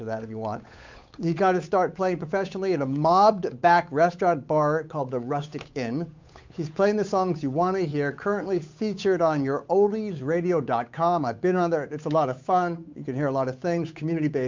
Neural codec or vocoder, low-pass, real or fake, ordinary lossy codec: codec, 16 kHz, 8 kbps, FunCodec, trained on Chinese and English, 25 frames a second; 7.2 kHz; fake; MP3, 64 kbps